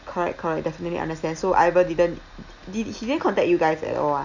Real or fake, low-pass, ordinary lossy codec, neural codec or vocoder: real; 7.2 kHz; none; none